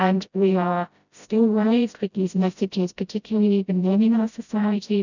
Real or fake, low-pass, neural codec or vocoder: fake; 7.2 kHz; codec, 16 kHz, 0.5 kbps, FreqCodec, smaller model